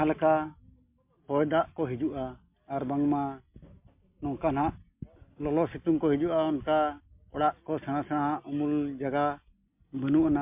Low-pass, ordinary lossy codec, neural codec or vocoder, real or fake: 3.6 kHz; MP3, 32 kbps; none; real